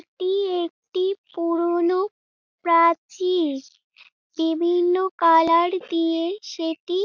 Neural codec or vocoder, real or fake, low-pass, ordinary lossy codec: none; real; 7.2 kHz; none